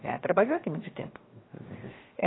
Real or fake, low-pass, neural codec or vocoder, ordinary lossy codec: fake; 7.2 kHz; codec, 16 kHz, 0.7 kbps, FocalCodec; AAC, 16 kbps